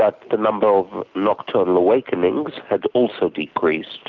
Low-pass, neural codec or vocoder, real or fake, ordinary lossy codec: 7.2 kHz; none; real; Opus, 32 kbps